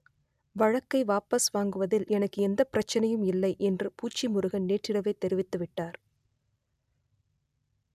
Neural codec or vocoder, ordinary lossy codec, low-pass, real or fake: none; none; 14.4 kHz; real